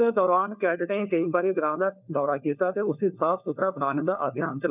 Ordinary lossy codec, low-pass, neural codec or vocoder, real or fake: none; 3.6 kHz; codec, 16 kHz, 4 kbps, FunCodec, trained on LibriTTS, 50 frames a second; fake